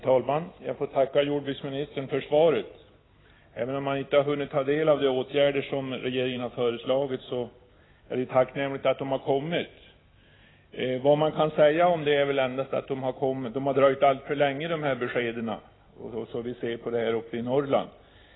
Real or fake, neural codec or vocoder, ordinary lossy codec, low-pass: real; none; AAC, 16 kbps; 7.2 kHz